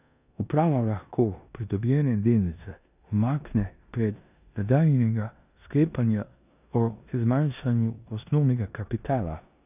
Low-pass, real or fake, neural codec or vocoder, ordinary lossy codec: 3.6 kHz; fake; codec, 16 kHz in and 24 kHz out, 0.9 kbps, LongCat-Audio-Codec, four codebook decoder; none